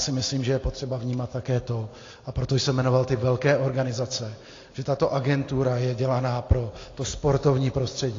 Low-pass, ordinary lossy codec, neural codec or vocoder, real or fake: 7.2 kHz; AAC, 32 kbps; none; real